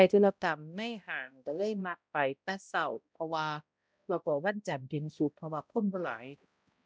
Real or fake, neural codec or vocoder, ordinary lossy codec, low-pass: fake; codec, 16 kHz, 0.5 kbps, X-Codec, HuBERT features, trained on balanced general audio; none; none